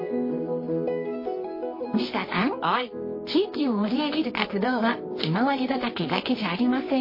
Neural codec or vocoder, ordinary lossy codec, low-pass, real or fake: codec, 24 kHz, 0.9 kbps, WavTokenizer, medium music audio release; MP3, 24 kbps; 5.4 kHz; fake